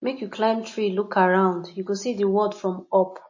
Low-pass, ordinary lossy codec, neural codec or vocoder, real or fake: 7.2 kHz; MP3, 32 kbps; none; real